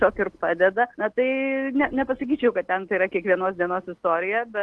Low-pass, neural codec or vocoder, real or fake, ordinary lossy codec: 10.8 kHz; none; real; Opus, 16 kbps